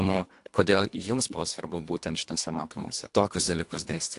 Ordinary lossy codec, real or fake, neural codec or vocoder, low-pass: AAC, 64 kbps; fake; codec, 24 kHz, 1.5 kbps, HILCodec; 10.8 kHz